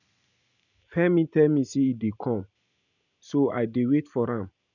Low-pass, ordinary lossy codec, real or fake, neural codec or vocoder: 7.2 kHz; none; real; none